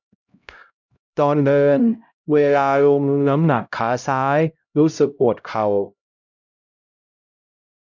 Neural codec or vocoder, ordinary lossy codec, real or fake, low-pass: codec, 16 kHz, 0.5 kbps, X-Codec, HuBERT features, trained on LibriSpeech; none; fake; 7.2 kHz